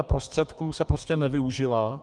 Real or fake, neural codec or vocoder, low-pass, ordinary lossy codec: fake; codec, 32 kHz, 1.9 kbps, SNAC; 10.8 kHz; Opus, 32 kbps